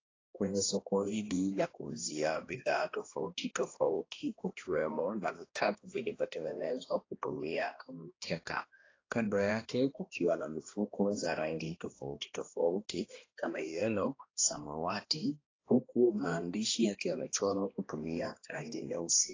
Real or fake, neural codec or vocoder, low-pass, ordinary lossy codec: fake; codec, 16 kHz, 1 kbps, X-Codec, HuBERT features, trained on balanced general audio; 7.2 kHz; AAC, 32 kbps